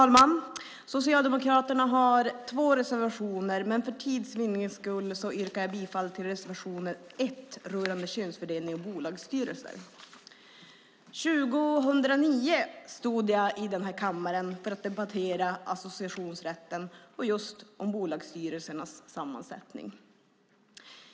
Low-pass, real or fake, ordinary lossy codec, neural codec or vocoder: none; real; none; none